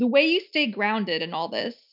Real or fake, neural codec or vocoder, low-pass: real; none; 5.4 kHz